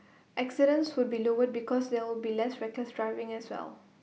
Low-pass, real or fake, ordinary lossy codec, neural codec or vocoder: none; real; none; none